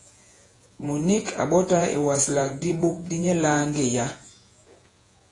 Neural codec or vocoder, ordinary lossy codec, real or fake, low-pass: vocoder, 48 kHz, 128 mel bands, Vocos; AAC, 32 kbps; fake; 10.8 kHz